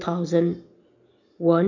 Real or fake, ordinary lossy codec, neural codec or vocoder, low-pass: real; none; none; 7.2 kHz